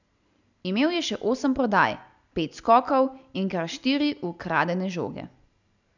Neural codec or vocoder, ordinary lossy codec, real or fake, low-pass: none; none; real; 7.2 kHz